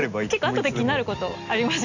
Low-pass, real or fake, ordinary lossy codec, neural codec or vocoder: 7.2 kHz; real; AAC, 48 kbps; none